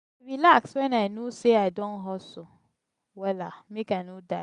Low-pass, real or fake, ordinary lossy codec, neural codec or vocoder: 10.8 kHz; real; none; none